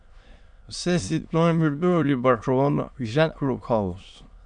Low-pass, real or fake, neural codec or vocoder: 9.9 kHz; fake; autoencoder, 22.05 kHz, a latent of 192 numbers a frame, VITS, trained on many speakers